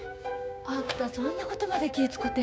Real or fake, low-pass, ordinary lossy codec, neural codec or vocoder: fake; none; none; codec, 16 kHz, 6 kbps, DAC